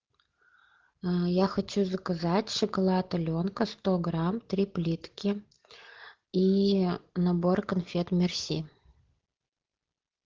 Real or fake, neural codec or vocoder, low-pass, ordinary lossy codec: real; none; 7.2 kHz; Opus, 16 kbps